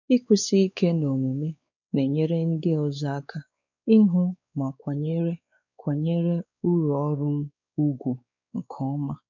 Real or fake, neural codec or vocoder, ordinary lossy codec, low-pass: fake; codec, 16 kHz, 4 kbps, X-Codec, WavLM features, trained on Multilingual LibriSpeech; none; 7.2 kHz